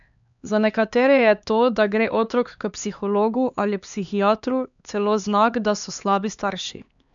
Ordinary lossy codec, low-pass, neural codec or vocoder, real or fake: none; 7.2 kHz; codec, 16 kHz, 2 kbps, X-Codec, HuBERT features, trained on LibriSpeech; fake